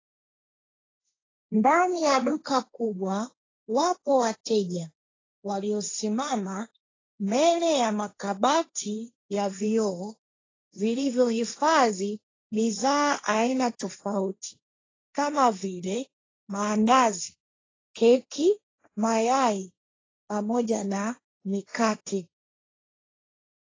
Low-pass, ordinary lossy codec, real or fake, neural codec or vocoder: 7.2 kHz; AAC, 32 kbps; fake; codec, 16 kHz, 1.1 kbps, Voila-Tokenizer